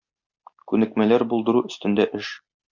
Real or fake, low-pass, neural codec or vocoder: real; 7.2 kHz; none